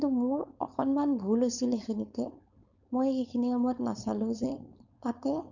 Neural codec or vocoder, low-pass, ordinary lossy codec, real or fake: codec, 16 kHz, 4.8 kbps, FACodec; 7.2 kHz; none; fake